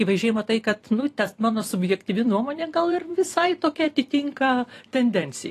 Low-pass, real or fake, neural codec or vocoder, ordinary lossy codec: 14.4 kHz; fake; vocoder, 44.1 kHz, 128 mel bands every 256 samples, BigVGAN v2; AAC, 48 kbps